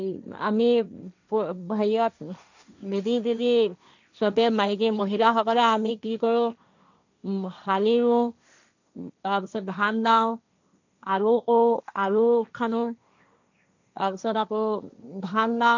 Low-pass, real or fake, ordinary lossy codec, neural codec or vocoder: 7.2 kHz; fake; none; codec, 16 kHz, 1.1 kbps, Voila-Tokenizer